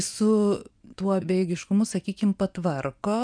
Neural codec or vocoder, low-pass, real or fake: vocoder, 24 kHz, 100 mel bands, Vocos; 9.9 kHz; fake